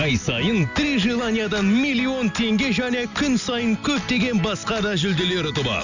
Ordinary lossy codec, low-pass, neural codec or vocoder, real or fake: none; 7.2 kHz; none; real